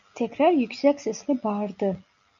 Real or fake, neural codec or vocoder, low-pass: real; none; 7.2 kHz